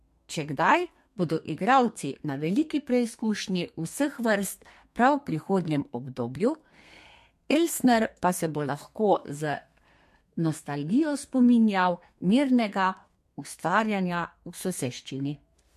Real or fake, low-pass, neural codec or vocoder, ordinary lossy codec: fake; 14.4 kHz; codec, 32 kHz, 1.9 kbps, SNAC; MP3, 64 kbps